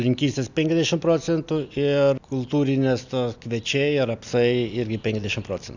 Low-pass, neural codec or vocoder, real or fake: 7.2 kHz; none; real